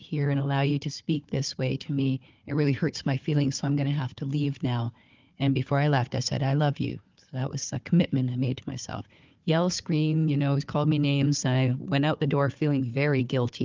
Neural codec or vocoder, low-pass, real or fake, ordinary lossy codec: codec, 16 kHz, 4 kbps, FunCodec, trained on Chinese and English, 50 frames a second; 7.2 kHz; fake; Opus, 32 kbps